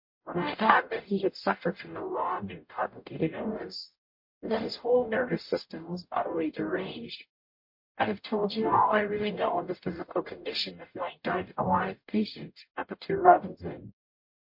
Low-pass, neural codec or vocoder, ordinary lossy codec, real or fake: 5.4 kHz; codec, 44.1 kHz, 0.9 kbps, DAC; MP3, 32 kbps; fake